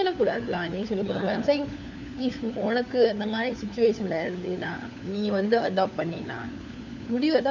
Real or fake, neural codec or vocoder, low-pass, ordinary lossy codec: fake; codec, 16 kHz, 4 kbps, FunCodec, trained on LibriTTS, 50 frames a second; 7.2 kHz; none